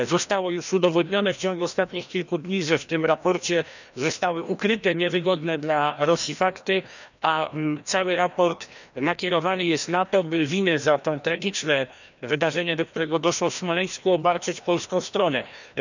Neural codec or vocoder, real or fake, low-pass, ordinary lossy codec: codec, 16 kHz, 1 kbps, FreqCodec, larger model; fake; 7.2 kHz; none